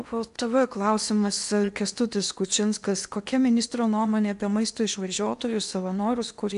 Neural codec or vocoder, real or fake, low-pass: codec, 16 kHz in and 24 kHz out, 0.8 kbps, FocalCodec, streaming, 65536 codes; fake; 10.8 kHz